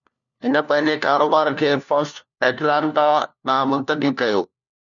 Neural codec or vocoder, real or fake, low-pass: codec, 16 kHz, 1 kbps, FunCodec, trained on LibriTTS, 50 frames a second; fake; 7.2 kHz